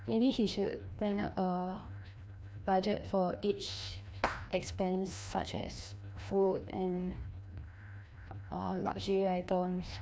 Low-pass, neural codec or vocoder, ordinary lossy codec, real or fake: none; codec, 16 kHz, 1 kbps, FreqCodec, larger model; none; fake